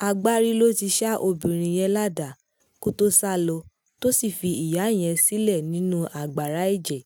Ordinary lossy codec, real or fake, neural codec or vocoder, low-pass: none; real; none; none